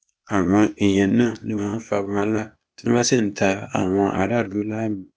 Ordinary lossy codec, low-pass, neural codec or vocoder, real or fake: none; none; codec, 16 kHz, 0.8 kbps, ZipCodec; fake